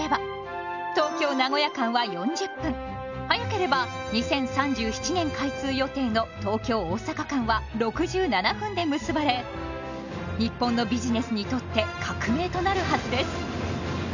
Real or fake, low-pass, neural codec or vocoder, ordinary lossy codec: real; 7.2 kHz; none; none